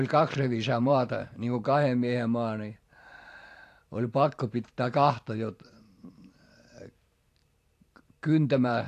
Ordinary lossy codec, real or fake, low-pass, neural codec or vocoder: AAC, 64 kbps; fake; 14.4 kHz; vocoder, 44.1 kHz, 128 mel bands every 512 samples, BigVGAN v2